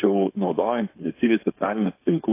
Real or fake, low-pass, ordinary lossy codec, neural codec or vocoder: fake; 3.6 kHz; AAC, 24 kbps; codec, 16 kHz, 4.8 kbps, FACodec